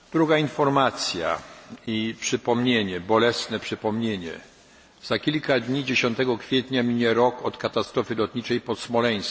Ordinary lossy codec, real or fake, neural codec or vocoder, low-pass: none; real; none; none